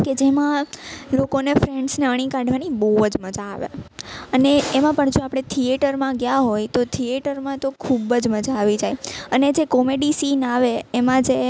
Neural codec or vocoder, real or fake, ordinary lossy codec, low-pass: none; real; none; none